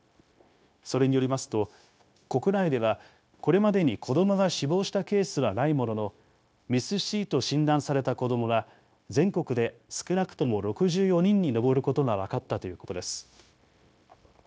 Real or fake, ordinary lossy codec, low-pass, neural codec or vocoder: fake; none; none; codec, 16 kHz, 0.9 kbps, LongCat-Audio-Codec